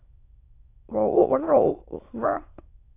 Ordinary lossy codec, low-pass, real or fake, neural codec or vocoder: AAC, 24 kbps; 3.6 kHz; fake; autoencoder, 22.05 kHz, a latent of 192 numbers a frame, VITS, trained on many speakers